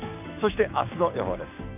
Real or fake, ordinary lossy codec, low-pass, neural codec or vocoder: fake; none; 3.6 kHz; codec, 16 kHz, 6 kbps, DAC